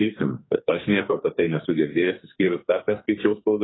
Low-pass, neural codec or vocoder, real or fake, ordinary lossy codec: 7.2 kHz; codec, 44.1 kHz, 2.6 kbps, SNAC; fake; AAC, 16 kbps